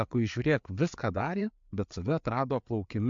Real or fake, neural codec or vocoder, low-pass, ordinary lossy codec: fake; codec, 16 kHz, 4 kbps, FreqCodec, larger model; 7.2 kHz; MP3, 64 kbps